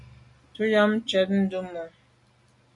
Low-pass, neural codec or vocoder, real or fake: 10.8 kHz; none; real